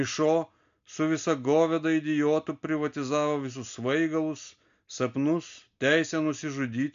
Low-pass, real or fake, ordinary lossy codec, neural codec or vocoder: 7.2 kHz; real; MP3, 64 kbps; none